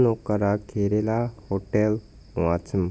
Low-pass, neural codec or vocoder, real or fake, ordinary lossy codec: none; none; real; none